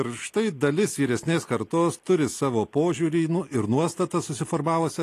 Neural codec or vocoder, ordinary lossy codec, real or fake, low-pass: none; AAC, 48 kbps; real; 14.4 kHz